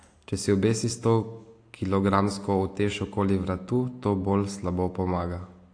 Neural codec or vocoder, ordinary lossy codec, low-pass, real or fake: none; AAC, 48 kbps; 9.9 kHz; real